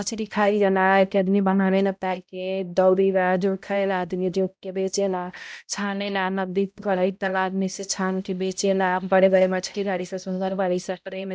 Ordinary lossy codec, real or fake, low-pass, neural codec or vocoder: none; fake; none; codec, 16 kHz, 0.5 kbps, X-Codec, HuBERT features, trained on balanced general audio